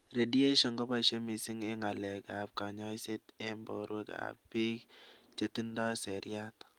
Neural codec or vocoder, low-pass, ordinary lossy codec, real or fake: none; 19.8 kHz; Opus, 32 kbps; real